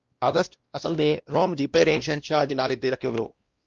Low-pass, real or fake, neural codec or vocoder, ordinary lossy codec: 7.2 kHz; fake; codec, 16 kHz, 1 kbps, X-Codec, WavLM features, trained on Multilingual LibriSpeech; Opus, 24 kbps